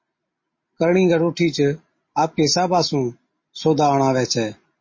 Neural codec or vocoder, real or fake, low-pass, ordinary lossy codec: none; real; 7.2 kHz; MP3, 32 kbps